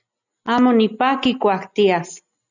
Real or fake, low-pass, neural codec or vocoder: real; 7.2 kHz; none